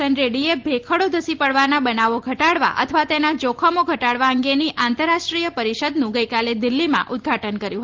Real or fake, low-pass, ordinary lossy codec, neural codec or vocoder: real; 7.2 kHz; Opus, 16 kbps; none